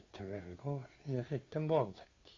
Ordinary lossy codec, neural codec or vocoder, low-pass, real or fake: AAC, 32 kbps; codec, 16 kHz, 2 kbps, FunCodec, trained on Chinese and English, 25 frames a second; 7.2 kHz; fake